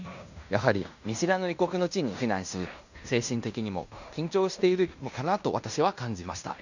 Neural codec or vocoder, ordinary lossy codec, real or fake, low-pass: codec, 16 kHz in and 24 kHz out, 0.9 kbps, LongCat-Audio-Codec, fine tuned four codebook decoder; none; fake; 7.2 kHz